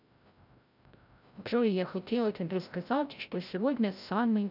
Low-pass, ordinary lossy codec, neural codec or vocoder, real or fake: 5.4 kHz; none; codec, 16 kHz, 0.5 kbps, FreqCodec, larger model; fake